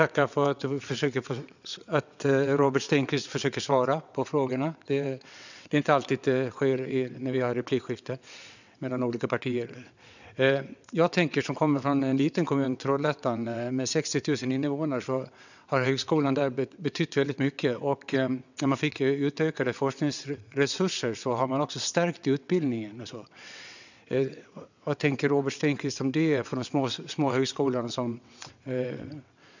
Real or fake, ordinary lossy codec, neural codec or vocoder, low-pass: fake; none; vocoder, 22.05 kHz, 80 mel bands, WaveNeXt; 7.2 kHz